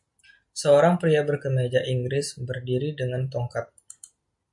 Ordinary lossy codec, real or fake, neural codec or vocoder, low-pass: MP3, 64 kbps; real; none; 10.8 kHz